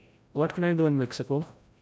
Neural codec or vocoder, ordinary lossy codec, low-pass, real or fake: codec, 16 kHz, 0.5 kbps, FreqCodec, larger model; none; none; fake